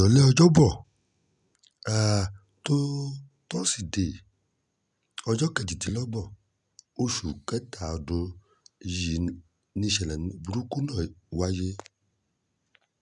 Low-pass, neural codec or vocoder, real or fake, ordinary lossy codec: 10.8 kHz; none; real; none